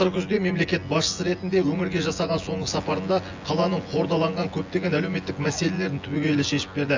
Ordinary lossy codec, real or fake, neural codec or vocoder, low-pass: AAC, 48 kbps; fake; vocoder, 24 kHz, 100 mel bands, Vocos; 7.2 kHz